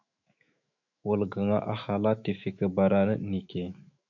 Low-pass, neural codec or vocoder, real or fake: 7.2 kHz; autoencoder, 48 kHz, 128 numbers a frame, DAC-VAE, trained on Japanese speech; fake